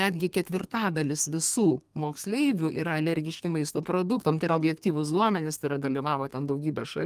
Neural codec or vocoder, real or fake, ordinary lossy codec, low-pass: codec, 32 kHz, 1.9 kbps, SNAC; fake; Opus, 32 kbps; 14.4 kHz